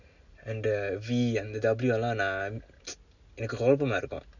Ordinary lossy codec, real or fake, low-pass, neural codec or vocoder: none; real; 7.2 kHz; none